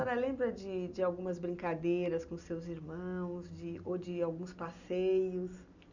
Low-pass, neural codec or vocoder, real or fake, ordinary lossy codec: 7.2 kHz; none; real; none